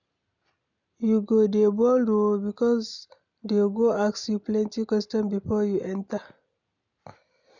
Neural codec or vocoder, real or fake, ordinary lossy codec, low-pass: none; real; none; 7.2 kHz